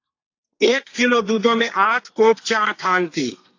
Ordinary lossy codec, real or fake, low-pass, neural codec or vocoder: AAC, 48 kbps; fake; 7.2 kHz; codec, 32 kHz, 1.9 kbps, SNAC